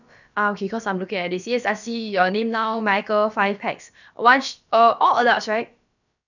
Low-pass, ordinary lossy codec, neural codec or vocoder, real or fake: 7.2 kHz; none; codec, 16 kHz, about 1 kbps, DyCAST, with the encoder's durations; fake